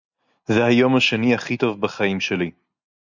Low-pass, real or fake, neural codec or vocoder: 7.2 kHz; real; none